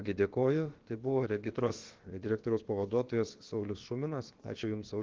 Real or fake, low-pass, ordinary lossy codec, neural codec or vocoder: fake; 7.2 kHz; Opus, 16 kbps; codec, 16 kHz, about 1 kbps, DyCAST, with the encoder's durations